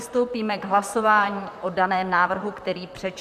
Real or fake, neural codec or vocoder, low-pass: fake; vocoder, 44.1 kHz, 128 mel bands, Pupu-Vocoder; 14.4 kHz